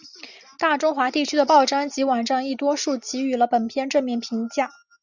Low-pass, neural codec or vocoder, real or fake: 7.2 kHz; none; real